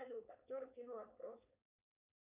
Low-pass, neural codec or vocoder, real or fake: 3.6 kHz; codec, 16 kHz, 4.8 kbps, FACodec; fake